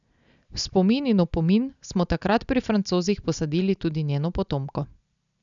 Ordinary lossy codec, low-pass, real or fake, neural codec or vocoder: none; 7.2 kHz; real; none